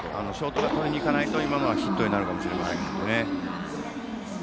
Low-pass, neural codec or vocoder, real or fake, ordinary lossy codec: none; none; real; none